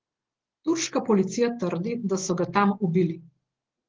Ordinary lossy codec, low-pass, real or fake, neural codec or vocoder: Opus, 16 kbps; 7.2 kHz; real; none